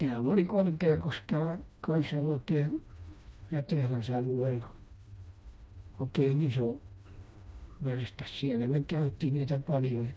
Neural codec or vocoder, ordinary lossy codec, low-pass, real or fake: codec, 16 kHz, 1 kbps, FreqCodec, smaller model; none; none; fake